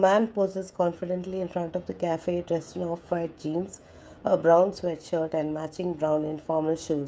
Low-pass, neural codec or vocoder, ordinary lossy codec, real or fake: none; codec, 16 kHz, 16 kbps, FreqCodec, smaller model; none; fake